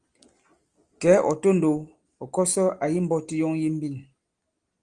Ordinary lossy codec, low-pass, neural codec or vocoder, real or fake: Opus, 24 kbps; 9.9 kHz; none; real